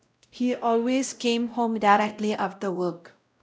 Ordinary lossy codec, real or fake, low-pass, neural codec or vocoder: none; fake; none; codec, 16 kHz, 0.5 kbps, X-Codec, WavLM features, trained on Multilingual LibriSpeech